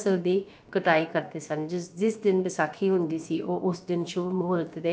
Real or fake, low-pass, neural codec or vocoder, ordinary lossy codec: fake; none; codec, 16 kHz, about 1 kbps, DyCAST, with the encoder's durations; none